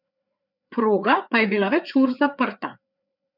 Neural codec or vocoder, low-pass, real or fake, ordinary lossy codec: codec, 16 kHz, 4 kbps, FreqCodec, larger model; 5.4 kHz; fake; none